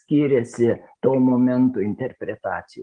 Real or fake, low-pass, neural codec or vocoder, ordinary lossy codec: real; 9.9 kHz; none; Opus, 24 kbps